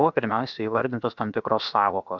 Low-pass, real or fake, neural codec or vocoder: 7.2 kHz; fake; codec, 16 kHz, about 1 kbps, DyCAST, with the encoder's durations